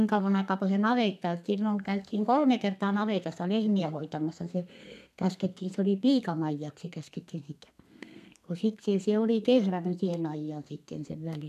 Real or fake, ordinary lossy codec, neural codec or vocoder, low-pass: fake; none; codec, 32 kHz, 1.9 kbps, SNAC; 14.4 kHz